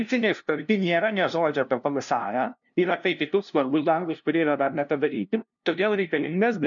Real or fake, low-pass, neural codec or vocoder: fake; 7.2 kHz; codec, 16 kHz, 0.5 kbps, FunCodec, trained on LibriTTS, 25 frames a second